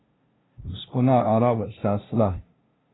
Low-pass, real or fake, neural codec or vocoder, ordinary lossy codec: 7.2 kHz; fake; codec, 16 kHz, 0.5 kbps, FunCodec, trained on LibriTTS, 25 frames a second; AAC, 16 kbps